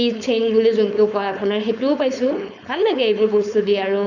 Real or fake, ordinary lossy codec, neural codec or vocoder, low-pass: fake; none; codec, 16 kHz, 4.8 kbps, FACodec; 7.2 kHz